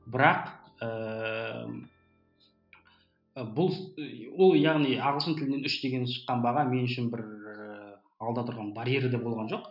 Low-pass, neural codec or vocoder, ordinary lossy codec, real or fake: 5.4 kHz; none; none; real